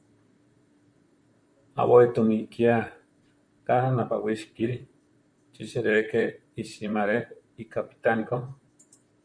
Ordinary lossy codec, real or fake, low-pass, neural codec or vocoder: MP3, 64 kbps; fake; 9.9 kHz; vocoder, 44.1 kHz, 128 mel bands, Pupu-Vocoder